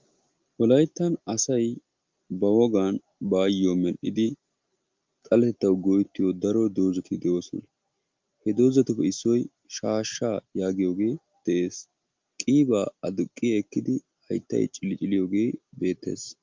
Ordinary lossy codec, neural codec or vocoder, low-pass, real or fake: Opus, 32 kbps; none; 7.2 kHz; real